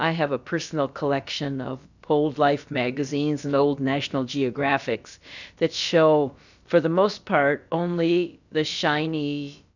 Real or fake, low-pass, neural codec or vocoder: fake; 7.2 kHz; codec, 16 kHz, about 1 kbps, DyCAST, with the encoder's durations